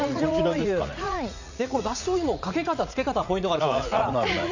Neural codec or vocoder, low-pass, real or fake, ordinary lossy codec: vocoder, 44.1 kHz, 80 mel bands, Vocos; 7.2 kHz; fake; none